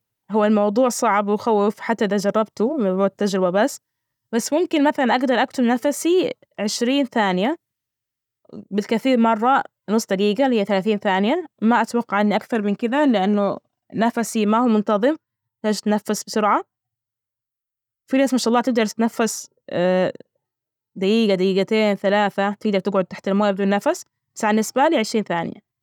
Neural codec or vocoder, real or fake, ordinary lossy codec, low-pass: none; real; none; 19.8 kHz